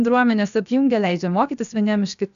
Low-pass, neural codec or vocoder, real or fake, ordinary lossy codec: 7.2 kHz; codec, 16 kHz, 0.7 kbps, FocalCodec; fake; AAC, 96 kbps